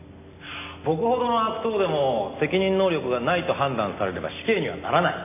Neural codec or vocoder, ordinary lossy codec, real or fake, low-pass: none; none; real; 3.6 kHz